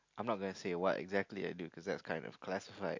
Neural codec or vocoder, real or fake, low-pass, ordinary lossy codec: none; real; 7.2 kHz; MP3, 48 kbps